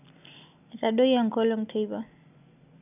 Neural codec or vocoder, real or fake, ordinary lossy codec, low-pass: none; real; none; 3.6 kHz